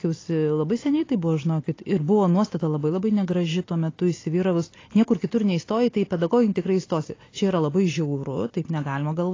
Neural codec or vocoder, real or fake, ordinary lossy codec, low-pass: none; real; AAC, 32 kbps; 7.2 kHz